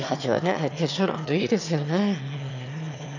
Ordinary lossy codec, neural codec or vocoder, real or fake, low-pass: none; autoencoder, 22.05 kHz, a latent of 192 numbers a frame, VITS, trained on one speaker; fake; 7.2 kHz